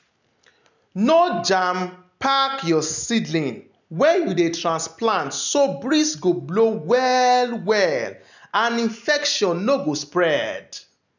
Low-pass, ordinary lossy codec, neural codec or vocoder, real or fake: 7.2 kHz; none; none; real